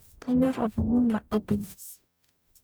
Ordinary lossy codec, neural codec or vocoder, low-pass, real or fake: none; codec, 44.1 kHz, 0.9 kbps, DAC; none; fake